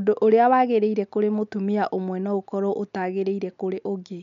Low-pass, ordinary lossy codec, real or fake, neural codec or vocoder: 7.2 kHz; MP3, 64 kbps; real; none